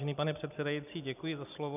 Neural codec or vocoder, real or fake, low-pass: none; real; 3.6 kHz